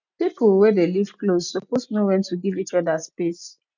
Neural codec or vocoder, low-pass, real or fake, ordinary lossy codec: none; 7.2 kHz; real; none